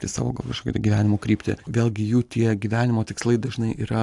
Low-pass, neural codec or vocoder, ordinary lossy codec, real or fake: 10.8 kHz; none; AAC, 64 kbps; real